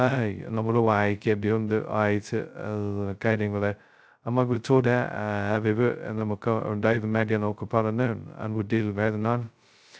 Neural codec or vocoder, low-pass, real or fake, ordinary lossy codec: codec, 16 kHz, 0.2 kbps, FocalCodec; none; fake; none